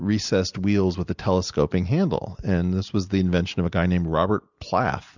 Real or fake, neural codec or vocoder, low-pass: real; none; 7.2 kHz